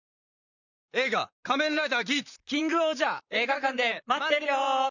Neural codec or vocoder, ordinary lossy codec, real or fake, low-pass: vocoder, 44.1 kHz, 128 mel bands, Pupu-Vocoder; none; fake; 7.2 kHz